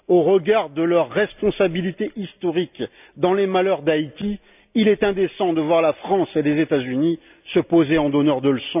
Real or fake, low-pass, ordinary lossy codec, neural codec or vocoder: real; 3.6 kHz; none; none